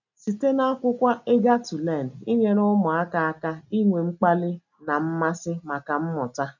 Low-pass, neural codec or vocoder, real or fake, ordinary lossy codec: 7.2 kHz; none; real; none